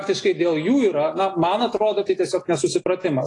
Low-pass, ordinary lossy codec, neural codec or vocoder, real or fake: 10.8 kHz; AAC, 32 kbps; none; real